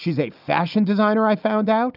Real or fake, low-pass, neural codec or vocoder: real; 5.4 kHz; none